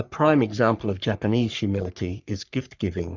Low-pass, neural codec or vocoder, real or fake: 7.2 kHz; codec, 44.1 kHz, 3.4 kbps, Pupu-Codec; fake